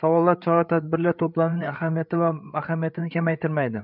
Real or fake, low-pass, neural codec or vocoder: fake; 5.4 kHz; codec, 16 kHz, 8 kbps, FreqCodec, larger model